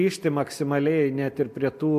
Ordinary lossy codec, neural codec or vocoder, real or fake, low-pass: MP3, 64 kbps; none; real; 14.4 kHz